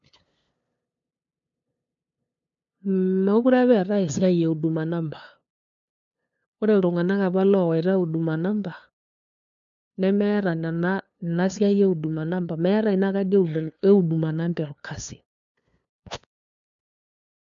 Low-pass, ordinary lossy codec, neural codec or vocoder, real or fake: 7.2 kHz; MP3, 64 kbps; codec, 16 kHz, 2 kbps, FunCodec, trained on LibriTTS, 25 frames a second; fake